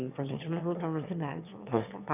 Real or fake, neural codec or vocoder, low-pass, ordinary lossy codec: fake; autoencoder, 22.05 kHz, a latent of 192 numbers a frame, VITS, trained on one speaker; 3.6 kHz; none